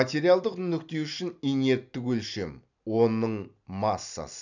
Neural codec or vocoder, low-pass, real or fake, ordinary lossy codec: none; 7.2 kHz; real; none